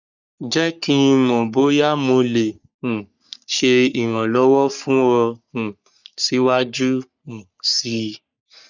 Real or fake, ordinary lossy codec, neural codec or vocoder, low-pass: fake; none; codec, 44.1 kHz, 3.4 kbps, Pupu-Codec; 7.2 kHz